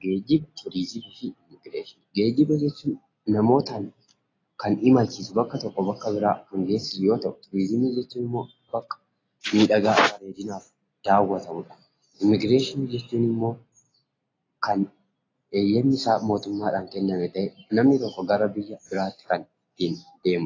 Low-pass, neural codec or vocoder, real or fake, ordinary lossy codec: 7.2 kHz; none; real; AAC, 32 kbps